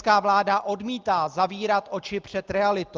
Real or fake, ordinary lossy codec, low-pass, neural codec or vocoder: real; Opus, 24 kbps; 7.2 kHz; none